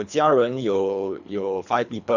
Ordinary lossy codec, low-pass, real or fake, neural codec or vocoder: none; 7.2 kHz; fake; codec, 24 kHz, 3 kbps, HILCodec